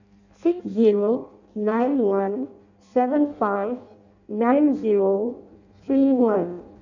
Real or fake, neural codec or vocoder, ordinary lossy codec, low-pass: fake; codec, 16 kHz in and 24 kHz out, 0.6 kbps, FireRedTTS-2 codec; none; 7.2 kHz